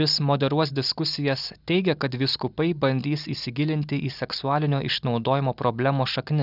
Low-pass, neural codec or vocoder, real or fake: 5.4 kHz; none; real